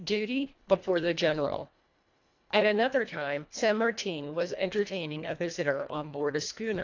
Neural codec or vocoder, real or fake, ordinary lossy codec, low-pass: codec, 24 kHz, 1.5 kbps, HILCodec; fake; AAC, 48 kbps; 7.2 kHz